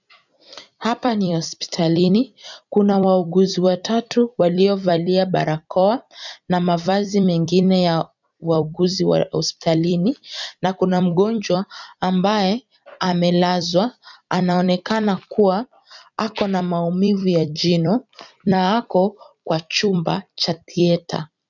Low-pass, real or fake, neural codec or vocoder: 7.2 kHz; fake; vocoder, 44.1 kHz, 128 mel bands every 256 samples, BigVGAN v2